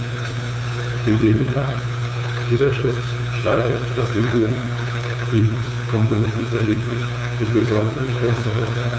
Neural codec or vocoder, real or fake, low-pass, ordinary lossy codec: codec, 16 kHz, 2 kbps, FunCodec, trained on LibriTTS, 25 frames a second; fake; none; none